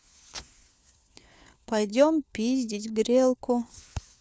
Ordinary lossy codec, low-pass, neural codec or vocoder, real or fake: none; none; codec, 16 kHz, 4 kbps, FunCodec, trained on LibriTTS, 50 frames a second; fake